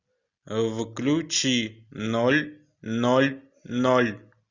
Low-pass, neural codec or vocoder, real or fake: 7.2 kHz; none; real